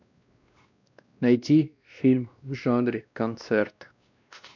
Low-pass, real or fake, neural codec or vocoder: 7.2 kHz; fake; codec, 16 kHz, 1 kbps, X-Codec, WavLM features, trained on Multilingual LibriSpeech